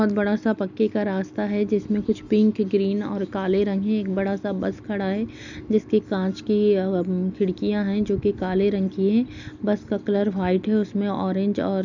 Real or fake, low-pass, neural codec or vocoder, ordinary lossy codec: real; 7.2 kHz; none; none